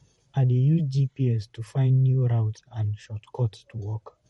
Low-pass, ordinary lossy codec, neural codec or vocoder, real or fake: 19.8 kHz; MP3, 48 kbps; vocoder, 44.1 kHz, 128 mel bands, Pupu-Vocoder; fake